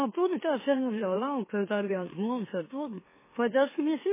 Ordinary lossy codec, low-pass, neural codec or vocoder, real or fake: MP3, 16 kbps; 3.6 kHz; autoencoder, 44.1 kHz, a latent of 192 numbers a frame, MeloTTS; fake